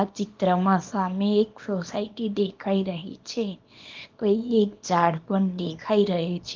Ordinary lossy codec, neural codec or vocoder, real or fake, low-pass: Opus, 32 kbps; codec, 24 kHz, 0.9 kbps, WavTokenizer, small release; fake; 7.2 kHz